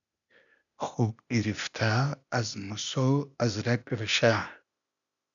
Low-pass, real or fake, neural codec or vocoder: 7.2 kHz; fake; codec, 16 kHz, 0.8 kbps, ZipCodec